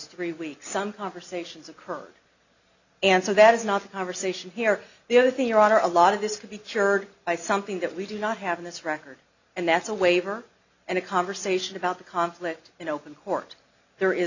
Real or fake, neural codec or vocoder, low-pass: real; none; 7.2 kHz